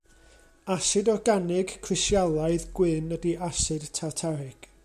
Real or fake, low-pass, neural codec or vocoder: real; 14.4 kHz; none